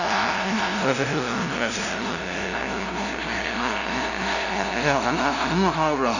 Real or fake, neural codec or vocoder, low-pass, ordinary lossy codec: fake; codec, 16 kHz, 0.5 kbps, FunCodec, trained on LibriTTS, 25 frames a second; 7.2 kHz; none